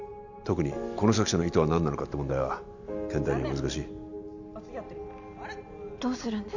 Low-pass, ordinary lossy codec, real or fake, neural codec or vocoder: 7.2 kHz; none; real; none